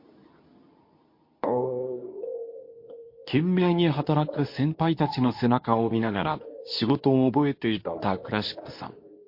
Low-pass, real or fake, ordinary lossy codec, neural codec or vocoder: 5.4 kHz; fake; MP3, 32 kbps; codec, 24 kHz, 0.9 kbps, WavTokenizer, medium speech release version 2